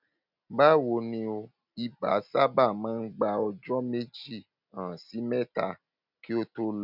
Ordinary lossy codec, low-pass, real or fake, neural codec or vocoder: none; 5.4 kHz; real; none